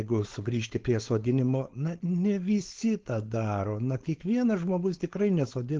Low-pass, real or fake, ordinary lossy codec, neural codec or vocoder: 7.2 kHz; fake; Opus, 16 kbps; codec, 16 kHz, 4.8 kbps, FACodec